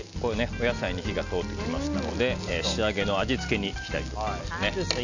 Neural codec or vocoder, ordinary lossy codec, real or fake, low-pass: none; none; real; 7.2 kHz